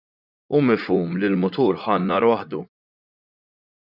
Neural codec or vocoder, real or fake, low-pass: vocoder, 44.1 kHz, 80 mel bands, Vocos; fake; 5.4 kHz